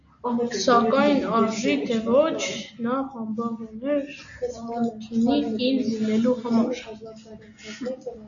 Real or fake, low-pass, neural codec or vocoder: real; 7.2 kHz; none